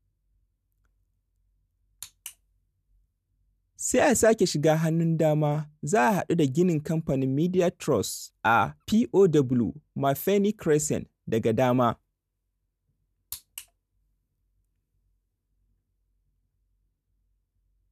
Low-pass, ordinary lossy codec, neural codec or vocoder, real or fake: 14.4 kHz; none; none; real